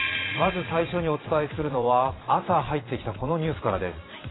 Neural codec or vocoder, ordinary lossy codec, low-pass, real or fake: vocoder, 22.05 kHz, 80 mel bands, Vocos; AAC, 16 kbps; 7.2 kHz; fake